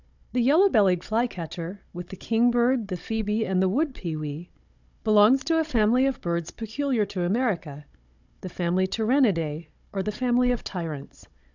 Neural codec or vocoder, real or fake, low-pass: codec, 16 kHz, 16 kbps, FunCodec, trained on Chinese and English, 50 frames a second; fake; 7.2 kHz